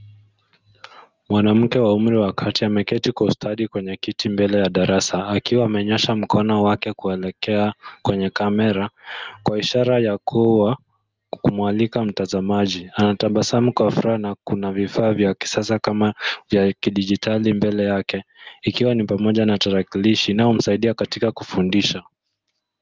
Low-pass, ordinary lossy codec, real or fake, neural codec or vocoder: 7.2 kHz; Opus, 32 kbps; real; none